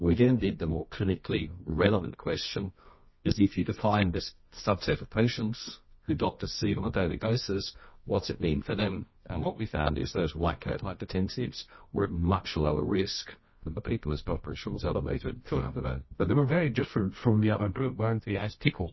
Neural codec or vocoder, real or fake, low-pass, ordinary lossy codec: codec, 24 kHz, 0.9 kbps, WavTokenizer, medium music audio release; fake; 7.2 kHz; MP3, 24 kbps